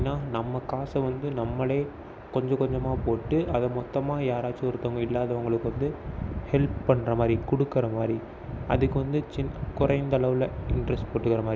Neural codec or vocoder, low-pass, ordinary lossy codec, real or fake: none; none; none; real